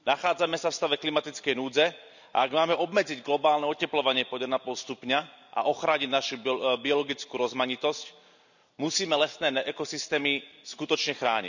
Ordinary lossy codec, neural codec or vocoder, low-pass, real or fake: none; none; 7.2 kHz; real